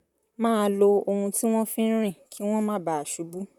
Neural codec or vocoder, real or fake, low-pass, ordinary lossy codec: vocoder, 44.1 kHz, 128 mel bands, Pupu-Vocoder; fake; 19.8 kHz; none